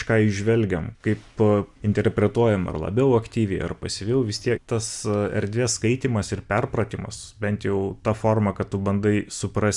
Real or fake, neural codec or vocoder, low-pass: real; none; 10.8 kHz